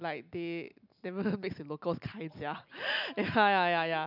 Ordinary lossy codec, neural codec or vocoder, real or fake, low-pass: none; none; real; 5.4 kHz